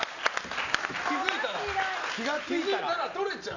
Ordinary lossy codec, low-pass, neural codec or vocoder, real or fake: none; 7.2 kHz; none; real